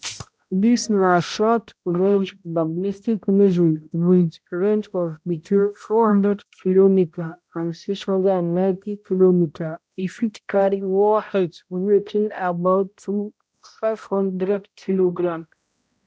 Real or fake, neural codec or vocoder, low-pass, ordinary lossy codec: fake; codec, 16 kHz, 0.5 kbps, X-Codec, HuBERT features, trained on balanced general audio; none; none